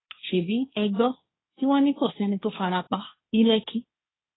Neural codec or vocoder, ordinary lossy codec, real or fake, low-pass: codec, 16 kHz, 1.1 kbps, Voila-Tokenizer; AAC, 16 kbps; fake; 7.2 kHz